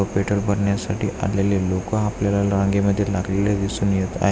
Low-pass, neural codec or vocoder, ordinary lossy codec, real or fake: none; none; none; real